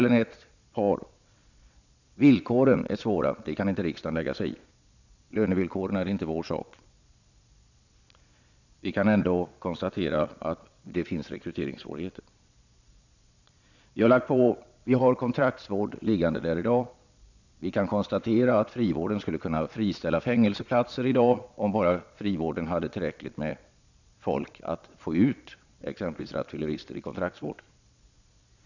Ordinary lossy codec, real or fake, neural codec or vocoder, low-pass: none; fake; vocoder, 22.05 kHz, 80 mel bands, Vocos; 7.2 kHz